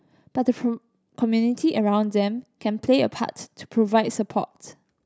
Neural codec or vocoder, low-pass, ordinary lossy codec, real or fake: none; none; none; real